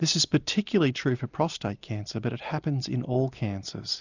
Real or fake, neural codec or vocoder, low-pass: real; none; 7.2 kHz